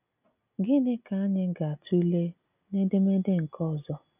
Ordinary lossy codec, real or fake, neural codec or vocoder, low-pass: none; real; none; 3.6 kHz